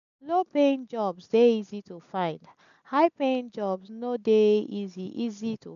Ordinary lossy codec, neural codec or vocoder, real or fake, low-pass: none; none; real; 7.2 kHz